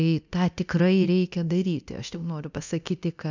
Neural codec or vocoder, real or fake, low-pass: codec, 24 kHz, 0.9 kbps, DualCodec; fake; 7.2 kHz